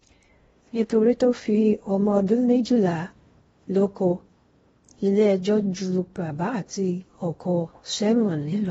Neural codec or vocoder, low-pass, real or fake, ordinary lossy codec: codec, 16 kHz in and 24 kHz out, 0.6 kbps, FocalCodec, streaming, 2048 codes; 10.8 kHz; fake; AAC, 24 kbps